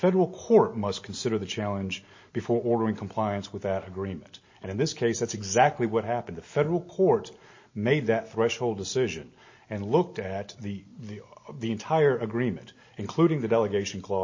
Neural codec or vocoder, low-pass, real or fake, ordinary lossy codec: none; 7.2 kHz; real; MP3, 32 kbps